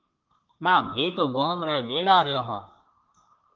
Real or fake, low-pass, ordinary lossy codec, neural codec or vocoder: fake; 7.2 kHz; Opus, 32 kbps; codec, 24 kHz, 1 kbps, SNAC